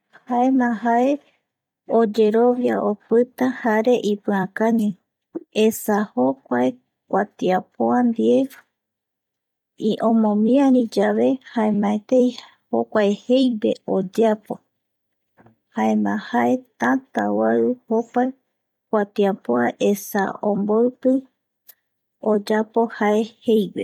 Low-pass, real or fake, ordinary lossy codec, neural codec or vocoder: 19.8 kHz; fake; none; vocoder, 44.1 kHz, 128 mel bands every 256 samples, BigVGAN v2